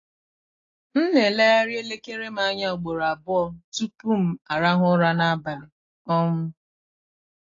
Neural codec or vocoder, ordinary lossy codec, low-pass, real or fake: none; AAC, 32 kbps; 7.2 kHz; real